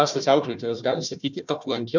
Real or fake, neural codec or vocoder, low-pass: fake; codec, 16 kHz, 1 kbps, FunCodec, trained on Chinese and English, 50 frames a second; 7.2 kHz